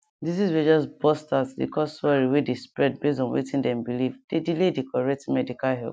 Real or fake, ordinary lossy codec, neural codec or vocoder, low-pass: real; none; none; none